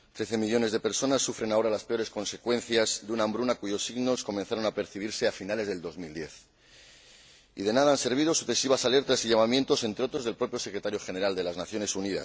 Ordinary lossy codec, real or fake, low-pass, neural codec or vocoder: none; real; none; none